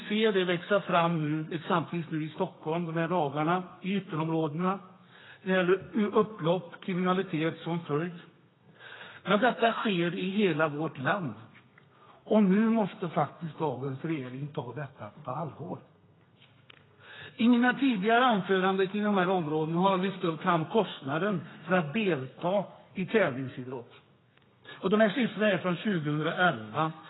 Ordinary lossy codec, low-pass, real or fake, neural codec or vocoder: AAC, 16 kbps; 7.2 kHz; fake; codec, 32 kHz, 1.9 kbps, SNAC